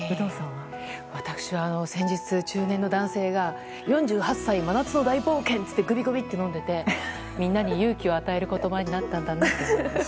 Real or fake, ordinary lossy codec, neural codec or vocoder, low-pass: real; none; none; none